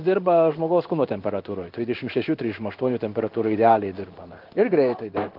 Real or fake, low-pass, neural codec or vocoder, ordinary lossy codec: fake; 5.4 kHz; codec, 16 kHz in and 24 kHz out, 1 kbps, XY-Tokenizer; Opus, 32 kbps